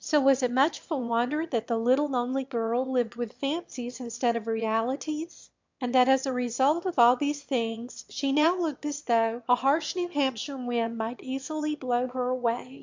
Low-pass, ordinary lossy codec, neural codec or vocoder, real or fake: 7.2 kHz; MP3, 64 kbps; autoencoder, 22.05 kHz, a latent of 192 numbers a frame, VITS, trained on one speaker; fake